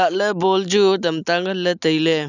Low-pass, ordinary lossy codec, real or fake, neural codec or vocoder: 7.2 kHz; none; real; none